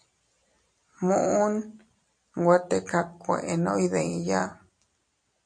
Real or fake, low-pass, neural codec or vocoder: real; 9.9 kHz; none